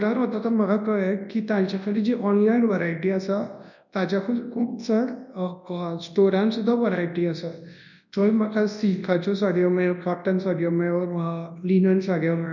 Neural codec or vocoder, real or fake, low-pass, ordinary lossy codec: codec, 24 kHz, 0.9 kbps, WavTokenizer, large speech release; fake; 7.2 kHz; none